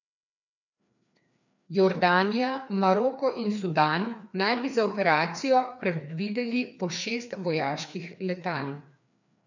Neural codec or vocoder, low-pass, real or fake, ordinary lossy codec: codec, 16 kHz, 2 kbps, FreqCodec, larger model; 7.2 kHz; fake; none